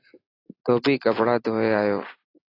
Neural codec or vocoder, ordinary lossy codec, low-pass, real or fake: none; AAC, 24 kbps; 5.4 kHz; real